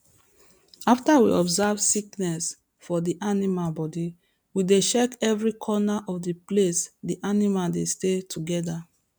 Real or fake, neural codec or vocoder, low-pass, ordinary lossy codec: real; none; none; none